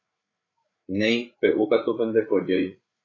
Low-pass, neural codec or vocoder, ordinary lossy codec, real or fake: 7.2 kHz; codec, 16 kHz, 8 kbps, FreqCodec, larger model; AAC, 32 kbps; fake